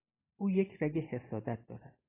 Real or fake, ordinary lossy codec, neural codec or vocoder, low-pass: real; AAC, 16 kbps; none; 3.6 kHz